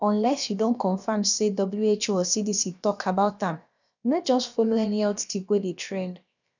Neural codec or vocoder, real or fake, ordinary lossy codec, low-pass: codec, 16 kHz, about 1 kbps, DyCAST, with the encoder's durations; fake; none; 7.2 kHz